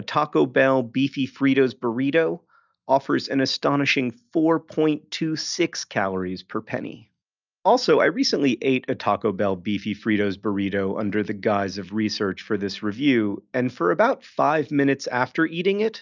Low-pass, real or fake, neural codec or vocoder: 7.2 kHz; real; none